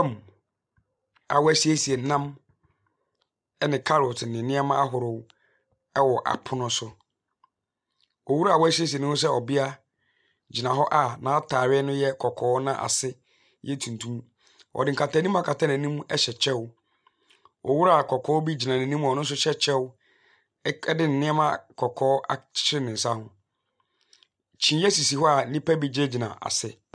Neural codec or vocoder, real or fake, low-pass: none; real; 9.9 kHz